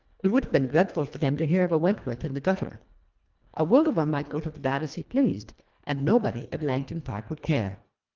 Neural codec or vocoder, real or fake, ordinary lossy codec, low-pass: codec, 24 kHz, 1.5 kbps, HILCodec; fake; Opus, 24 kbps; 7.2 kHz